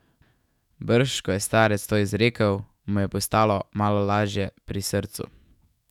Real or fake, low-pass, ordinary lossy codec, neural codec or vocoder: fake; 19.8 kHz; none; autoencoder, 48 kHz, 128 numbers a frame, DAC-VAE, trained on Japanese speech